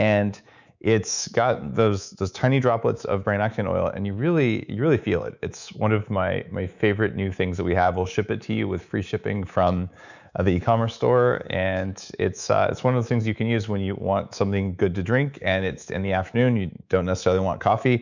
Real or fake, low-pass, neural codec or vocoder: fake; 7.2 kHz; codec, 24 kHz, 3.1 kbps, DualCodec